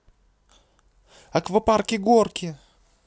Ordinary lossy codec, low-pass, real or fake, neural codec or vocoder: none; none; real; none